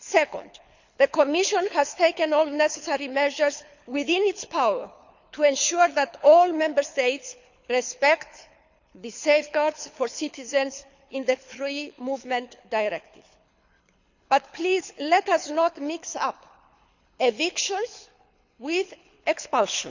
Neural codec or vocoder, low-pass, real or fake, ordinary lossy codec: codec, 24 kHz, 6 kbps, HILCodec; 7.2 kHz; fake; none